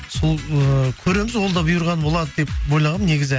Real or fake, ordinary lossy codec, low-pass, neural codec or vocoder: real; none; none; none